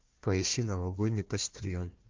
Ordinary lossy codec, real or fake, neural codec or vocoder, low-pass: Opus, 32 kbps; fake; codec, 16 kHz, 1 kbps, FunCodec, trained on Chinese and English, 50 frames a second; 7.2 kHz